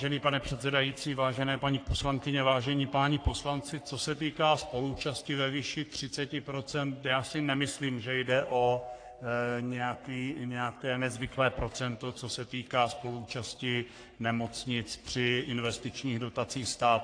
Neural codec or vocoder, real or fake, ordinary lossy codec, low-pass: codec, 44.1 kHz, 3.4 kbps, Pupu-Codec; fake; AAC, 48 kbps; 9.9 kHz